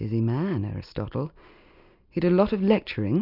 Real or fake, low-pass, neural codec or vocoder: real; 5.4 kHz; none